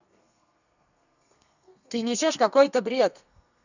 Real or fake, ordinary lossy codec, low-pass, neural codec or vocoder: fake; none; 7.2 kHz; codec, 32 kHz, 1.9 kbps, SNAC